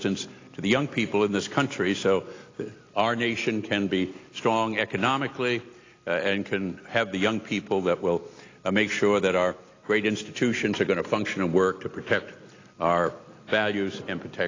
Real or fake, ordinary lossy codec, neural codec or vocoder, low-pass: real; AAC, 32 kbps; none; 7.2 kHz